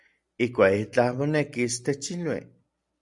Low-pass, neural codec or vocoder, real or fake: 10.8 kHz; none; real